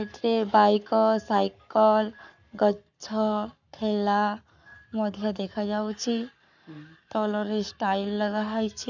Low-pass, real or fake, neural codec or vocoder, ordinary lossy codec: 7.2 kHz; fake; codec, 44.1 kHz, 7.8 kbps, Pupu-Codec; none